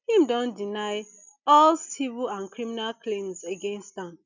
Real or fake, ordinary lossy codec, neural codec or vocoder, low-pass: real; none; none; 7.2 kHz